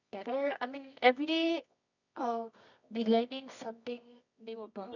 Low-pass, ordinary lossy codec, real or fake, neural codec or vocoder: 7.2 kHz; none; fake; codec, 24 kHz, 0.9 kbps, WavTokenizer, medium music audio release